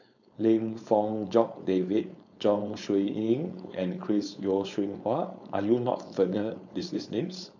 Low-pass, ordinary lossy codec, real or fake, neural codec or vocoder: 7.2 kHz; none; fake; codec, 16 kHz, 4.8 kbps, FACodec